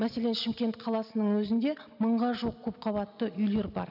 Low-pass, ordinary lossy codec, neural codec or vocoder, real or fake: 5.4 kHz; none; none; real